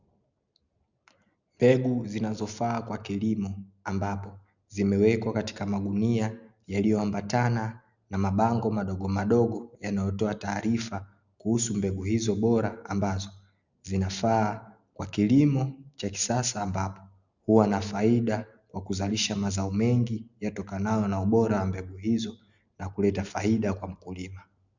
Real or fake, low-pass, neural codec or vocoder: real; 7.2 kHz; none